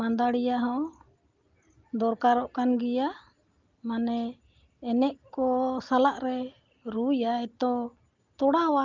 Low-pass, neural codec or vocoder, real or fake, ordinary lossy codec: 7.2 kHz; none; real; Opus, 32 kbps